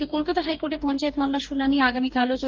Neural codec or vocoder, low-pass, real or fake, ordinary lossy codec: codec, 44.1 kHz, 2.6 kbps, DAC; 7.2 kHz; fake; Opus, 24 kbps